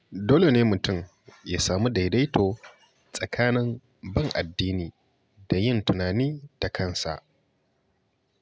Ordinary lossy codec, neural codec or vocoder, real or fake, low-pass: none; none; real; none